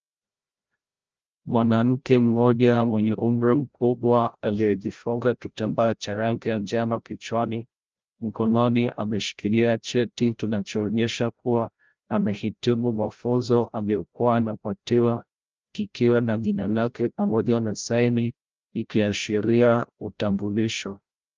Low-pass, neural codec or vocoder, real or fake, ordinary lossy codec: 7.2 kHz; codec, 16 kHz, 0.5 kbps, FreqCodec, larger model; fake; Opus, 24 kbps